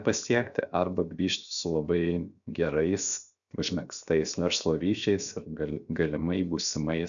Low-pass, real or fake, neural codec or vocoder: 7.2 kHz; fake; codec, 16 kHz, 0.7 kbps, FocalCodec